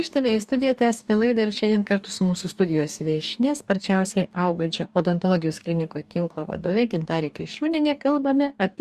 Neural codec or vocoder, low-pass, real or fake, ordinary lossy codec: codec, 44.1 kHz, 2.6 kbps, DAC; 14.4 kHz; fake; Opus, 64 kbps